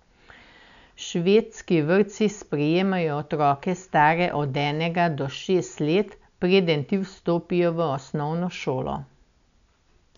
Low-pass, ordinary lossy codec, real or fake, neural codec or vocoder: 7.2 kHz; none; real; none